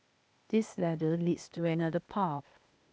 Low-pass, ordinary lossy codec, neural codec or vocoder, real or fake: none; none; codec, 16 kHz, 0.8 kbps, ZipCodec; fake